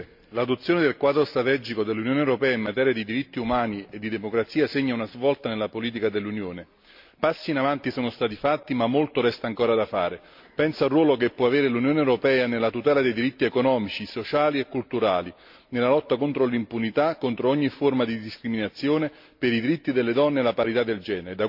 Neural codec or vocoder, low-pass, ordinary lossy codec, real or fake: none; 5.4 kHz; MP3, 48 kbps; real